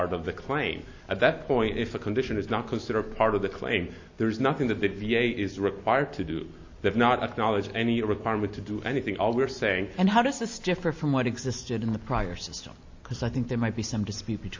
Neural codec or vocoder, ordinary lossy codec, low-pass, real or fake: none; AAC, 48 kbps; 7.2 kHz; real